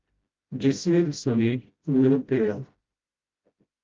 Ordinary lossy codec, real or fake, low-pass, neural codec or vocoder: Opus, 32 kbps; fake; 7.2 kHz; codec, 16 kHz, 0.5 kbps, FreqCodec, smaller model